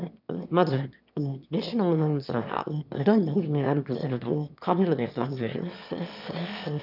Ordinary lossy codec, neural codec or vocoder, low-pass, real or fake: none; autoencoder, 22.05 kHz, a latent of 192 numbers a frame, VITS, trained on one speaker; 5.4 kHz; fake